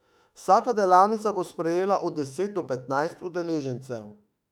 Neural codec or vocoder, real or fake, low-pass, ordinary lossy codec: autoencoder, 48 kHz, 32 numbers a frame, DAC-VAE, trained on Japanese speech; fake; 19.8 kHz; none